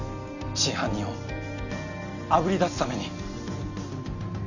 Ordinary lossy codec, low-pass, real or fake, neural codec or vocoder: none; 7.2 kHz; real; none